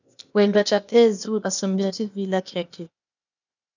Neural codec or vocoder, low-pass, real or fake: codec, 16 kHz, 0.8 kbps, ZipCodec; 7.2 kHz; fake